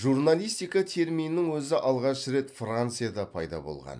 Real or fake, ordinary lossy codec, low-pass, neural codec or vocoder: real; none; 9.9 kHz; none